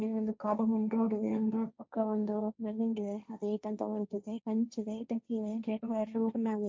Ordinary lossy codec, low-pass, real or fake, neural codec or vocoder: none; none; fake; codec, 16 kHz, 1.1 kbps, Voila-Tokenizer